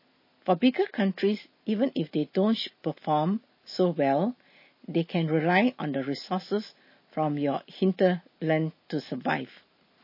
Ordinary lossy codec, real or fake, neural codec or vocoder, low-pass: MP3, 24 kbps; real; none; 5.4 kHz